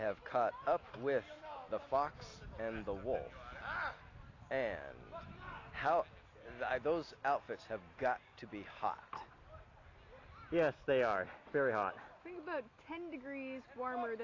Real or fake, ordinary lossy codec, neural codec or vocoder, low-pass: real; AAC, 32 kbps; none; 7.2 kHz